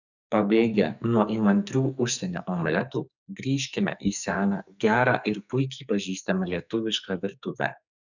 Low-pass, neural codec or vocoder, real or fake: 7.2 kHz; codec, 44.1 kHz, 2.6 kbps, SNAC; fake